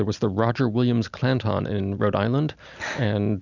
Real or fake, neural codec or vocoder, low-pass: real; none; 7.2 kHz